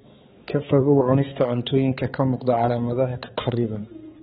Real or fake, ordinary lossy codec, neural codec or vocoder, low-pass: fake; AAC, 16 kbps; codec, 16 kHz, 4 kbps, X-Codec, HuBERT features, trained on balanced general audio; 7.2 kHz